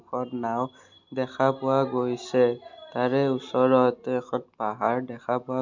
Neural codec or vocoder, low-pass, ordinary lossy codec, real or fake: none; 7.2 kHz; MP3, 64 kbps; real